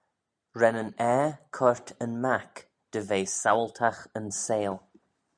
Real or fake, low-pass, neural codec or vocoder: real; 9.9 kHz; none